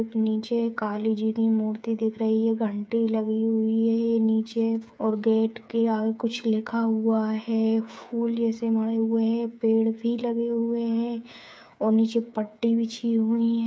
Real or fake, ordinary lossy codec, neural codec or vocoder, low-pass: fake; none; codec, 16 kHz, 8 kbps, FreqCodec, smaller model; none